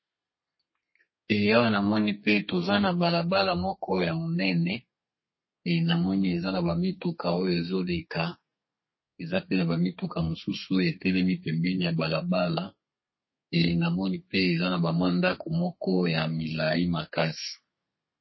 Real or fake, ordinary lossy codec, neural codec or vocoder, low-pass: fake; MP3, 24 kbps; codec, 32 kHz, 1.9 kbps, SNAC; 7.2 kHz